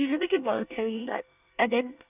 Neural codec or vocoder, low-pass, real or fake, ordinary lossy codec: codec, 24 kHz, 1 kbps, SNAC; 3.6 kHz; fake; none